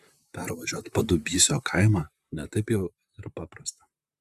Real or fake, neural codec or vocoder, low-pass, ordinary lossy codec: fake; vocoder, 44.1 kHz, 128 mel bands, Pupu-Vocoder; 14.4 kHz; Opus, 64 kbps